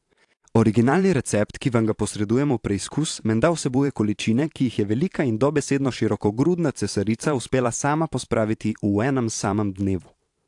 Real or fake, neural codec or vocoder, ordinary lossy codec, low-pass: fake; vocoder, 44.1 kHz, 128 mel bands every 512 samples, BigVGAN v2; AAC, 64 kbps; 10.8 kHz